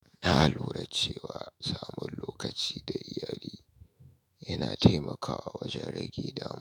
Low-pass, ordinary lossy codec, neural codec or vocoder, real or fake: none; none; autoencoder, 48 kHz, 128 numbers a frame, DAC-VAE, trained on Japanese speech; fake